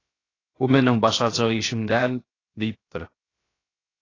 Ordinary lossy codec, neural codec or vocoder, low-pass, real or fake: AAC, 32 kbps; codec, 16 kHz, 0.7 kbps, FocalCodec; 7.2 kHz; fake